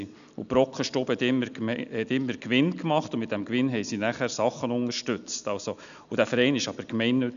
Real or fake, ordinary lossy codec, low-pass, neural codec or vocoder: real; none; 7.2 kHz; none